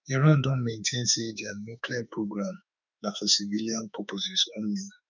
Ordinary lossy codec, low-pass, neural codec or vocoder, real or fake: none; 7.2 kHz; autoencoder, 48 kHz, 32 numbers a frame, DAC-VAE, trained on Japanese speech; fake